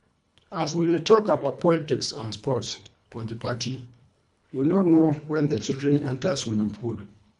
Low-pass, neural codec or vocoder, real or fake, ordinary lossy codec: 10.8 kHz; codec, 24 kHz, 1.5 kbps, HILCodec; fake; none